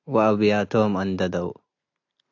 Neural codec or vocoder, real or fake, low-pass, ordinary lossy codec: none; real; 7.2 kHz; AAC, 48 kbps